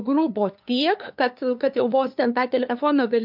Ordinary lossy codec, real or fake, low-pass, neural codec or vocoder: MP3, 48 kbps; fake; 5.4 kHz; codec, 16 kHz, 2 kbps, FunCodec, trained on LibriTTS, 25 frames a second